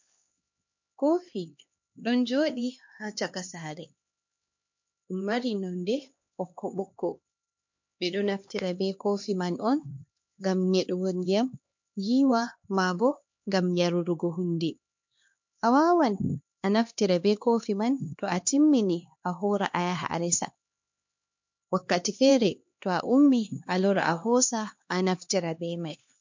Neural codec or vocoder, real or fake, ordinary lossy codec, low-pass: codec, 16 kHz, 2 kbps, X-Codec, HuBERT features, trained on LibriSpeech; fake; MP3, 48 kbps; 7.2 kHz